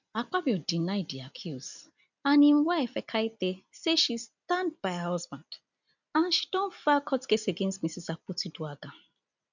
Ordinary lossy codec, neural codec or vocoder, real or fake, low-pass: none; none; real; 7.2 kHz